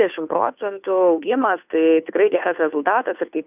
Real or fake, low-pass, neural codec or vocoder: fake; 3.6 kHz; codec, 16 kHz, 2 kbps, FunCodec, trained on Chinese and English, 25 frames a second